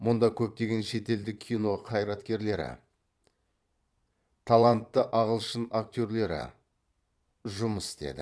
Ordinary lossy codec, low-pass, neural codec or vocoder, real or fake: none; none; vocoder, 22.05 kHz, 80 mel bands, Vocos; fake